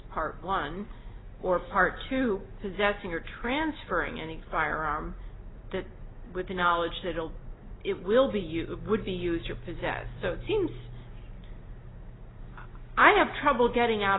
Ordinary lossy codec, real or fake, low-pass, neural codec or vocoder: AAC, 16 kbps; real; 7.2 kHz; none